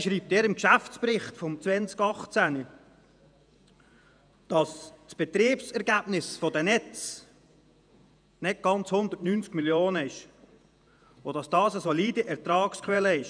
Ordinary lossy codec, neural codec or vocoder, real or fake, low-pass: none; vocoder, 48 kHz, 128 mel bands, Vocos; fake; 9.9 kHz